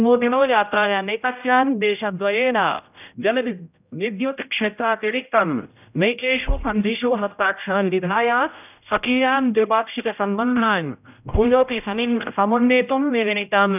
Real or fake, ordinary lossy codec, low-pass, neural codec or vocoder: fake; none; 3.6 kHz; codec, 16 kHz, 0.5 kbps, X-Codec, HuBERT features, trained on general audio